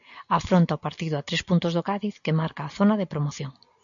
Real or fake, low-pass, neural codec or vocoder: real; 7.2 kHz; none